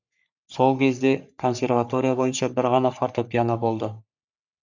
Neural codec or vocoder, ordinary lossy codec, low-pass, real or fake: codec, 44.1 kHz, 3.4 kbps, Pupu-Codec; none; 7.2 kHz; fake